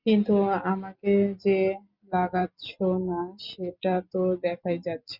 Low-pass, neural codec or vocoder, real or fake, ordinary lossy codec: 5.4 kHz; none; real; Opus, 64 kbps